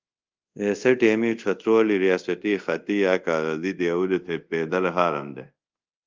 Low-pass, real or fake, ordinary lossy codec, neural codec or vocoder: 7.2 kHz; fake; Opus, 24 kbps; codec, 24 kHz, 0.5 kbps, DualCodec